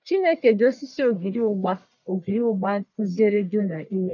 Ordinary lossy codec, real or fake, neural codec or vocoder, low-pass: none; fake; codec, 44.1 kHz, 1.7 kbps, Pupu-Codec; 7.2 kHz